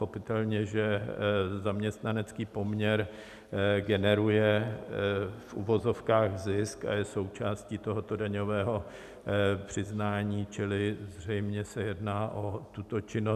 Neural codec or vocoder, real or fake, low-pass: none; real; 14.4 kHz